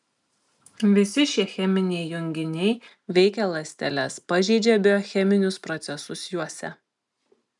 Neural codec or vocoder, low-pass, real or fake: none; 10.8 kHz; real